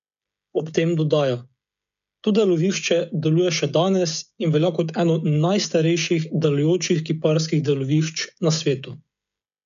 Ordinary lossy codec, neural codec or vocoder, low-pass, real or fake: none; codec, 16 kHz, 16 kbps, FreqCodec, smaller model; 7.2 kHz; fake